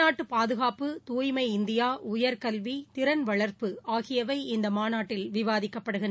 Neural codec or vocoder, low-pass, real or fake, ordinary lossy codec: none; none; real; none